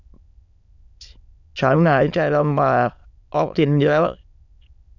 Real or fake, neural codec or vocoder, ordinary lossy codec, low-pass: fake; autoencoder, 22.05 kHz, a latent of 192 numbers a frame, VITS, trained on many speakers; none; 7.2 kHz